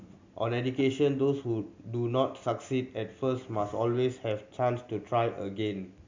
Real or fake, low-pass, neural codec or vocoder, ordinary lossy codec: real; 7.2 kHz; none; MP3, 64 kbps